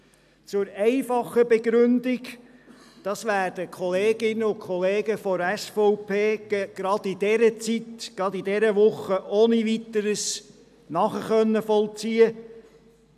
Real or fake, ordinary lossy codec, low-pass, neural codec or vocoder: real; none; 14.4 kHz; none